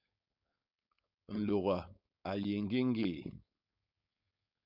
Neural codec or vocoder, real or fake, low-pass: codec, 16 kHz, 4.8 kbps, FACodec; fake; 5.4 kHz